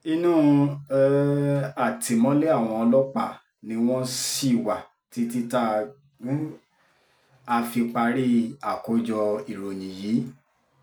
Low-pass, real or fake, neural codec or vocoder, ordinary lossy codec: none; real; none; none